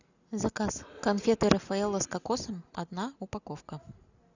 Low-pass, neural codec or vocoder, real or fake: 7.2 kHz; none; real